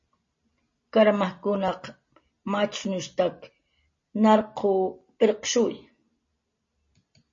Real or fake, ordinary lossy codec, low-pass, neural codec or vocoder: real; MP3, 32 kbps; 7.2 kHz; none